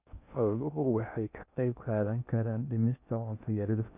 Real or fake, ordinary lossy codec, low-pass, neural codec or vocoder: fake; none; 3.6 kHz; codec, 16 kHz in and 24 kHz out, 0.6 kbps, FocalCodec, streaming, 2048 codes